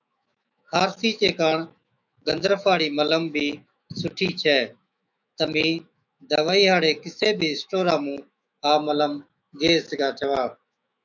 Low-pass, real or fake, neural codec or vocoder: 7.2 kHz; fake; autoencoder, 48 kHz, 128 numbers a frame, DAC-VAE, trained on Japanese speech